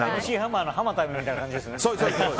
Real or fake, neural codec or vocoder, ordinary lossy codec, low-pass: real; none; none; none